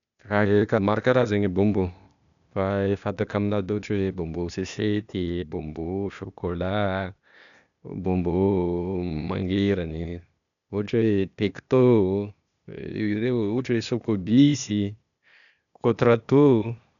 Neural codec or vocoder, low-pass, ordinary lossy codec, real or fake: codec, 16 kHz, 0.8 kbps, ZipCodec; 7.2 kHz; none; fake